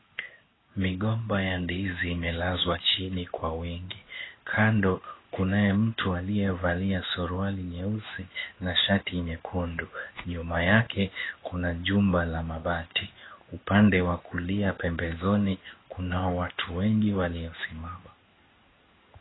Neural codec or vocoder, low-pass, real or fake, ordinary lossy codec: codec, 16 kHz in and 24 kHz out, 1 kbps, XY-Tokenizer; 7.2 kHz; fake; AAC, 16 kbps